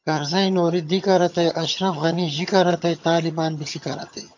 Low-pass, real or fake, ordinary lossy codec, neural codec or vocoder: 7.2 kHz; fake; AAC, 48 kbps; vocoder, 22.05 kHz, 80 mel bands, HiFi-GAN